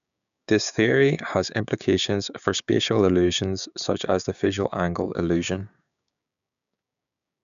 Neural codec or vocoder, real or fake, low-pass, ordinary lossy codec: codec, 16 kHz, 6 kbps, DAC; fake; 7.2 kHz; none